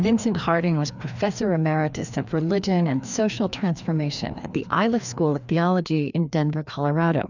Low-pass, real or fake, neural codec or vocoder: 7.2 kHz; fake; codec, 16 kHz, 2 kbps, FreqCodec, larger model